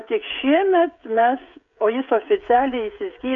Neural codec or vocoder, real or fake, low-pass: codec, 16 kHz, 16 kbps, FreqCodec, smaller model; fake; 7.2 kHz